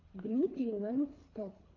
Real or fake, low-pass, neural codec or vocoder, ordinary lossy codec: fake; 7.2 kHz; codec, 24 kHz, 3 kbps, HILCodec; AAC, 32 kbps